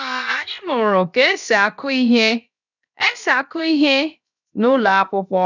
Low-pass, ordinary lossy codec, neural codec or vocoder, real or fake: 7.2 kHz; none; codec, 16 kHz, about 1 kbps, DyCAST, with the encoder's durations; fake